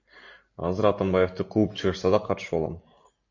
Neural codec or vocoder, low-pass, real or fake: none; 7.2 kHz; real